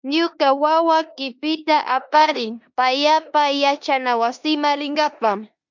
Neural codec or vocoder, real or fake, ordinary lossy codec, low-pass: codec, 16 kHz in and 24 kHz out, 0.9 kbps, LongCat-Audio-Codec, four codebook decoder; fake; MP3, 64 kbps; 7.2 kHz